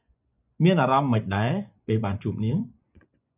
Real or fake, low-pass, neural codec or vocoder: fake; 3.6 kHz; vocoder, 24 kHz, 100 mel bands, Vocos